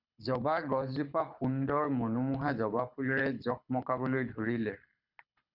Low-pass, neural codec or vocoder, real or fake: 5.4 kHz; codec, 24 kHz, 6 kbps, HILCodec; fake